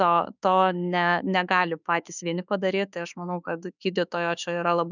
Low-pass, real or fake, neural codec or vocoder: 7.2 kHz; fake; autoencoder, 48 kHz, 32 numbers a frame, DAC-VAE, trained on Japanese speech